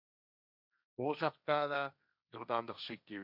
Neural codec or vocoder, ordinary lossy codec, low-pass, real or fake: codec, 16 kHz, 1.1 kbps, Voila-Tokenizer; none; 5.4 kHz; fake